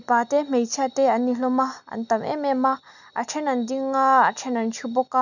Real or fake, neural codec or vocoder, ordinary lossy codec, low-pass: real; none; none; 7.2 kHz